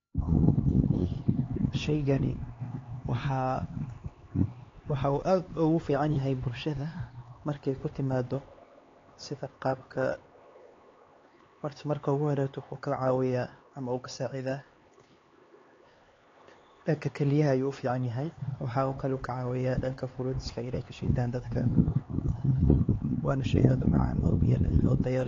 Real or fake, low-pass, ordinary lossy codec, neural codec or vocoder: fake; 7.2 kHz; AAC, 32 kbps; codec, 16 kHz, 4 kbps, X-Codec, HuBERT features, trained on LibriSpeech